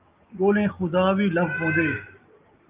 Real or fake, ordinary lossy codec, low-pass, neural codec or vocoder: real; Opus, 24 kbps; 3.6 kHz; none